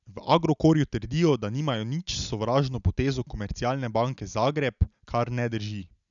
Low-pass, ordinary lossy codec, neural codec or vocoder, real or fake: 7.2 kHz; none; none; real